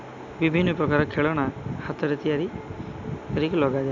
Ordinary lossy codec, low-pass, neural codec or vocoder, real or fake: none; 7.2 kHz; none; real